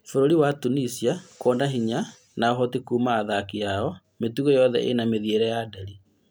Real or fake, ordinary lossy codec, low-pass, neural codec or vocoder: real; none; none; none